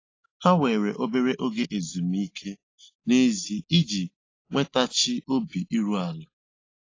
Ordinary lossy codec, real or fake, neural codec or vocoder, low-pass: AAC, 32 kbps; real; none; 7.2 kHz